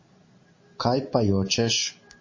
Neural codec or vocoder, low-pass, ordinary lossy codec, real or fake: none; 7.2 kHz; MP3, 32 kbps; real